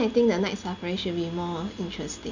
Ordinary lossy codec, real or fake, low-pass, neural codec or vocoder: none; real; 7.2 kHz; none